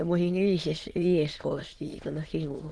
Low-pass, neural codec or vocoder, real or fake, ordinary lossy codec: 9.9 kHz; autoencoder, 22.05 kHz, a latent of 192 numbers a frame, VITS, trained on many speakers; fake; Opus, 16 kbps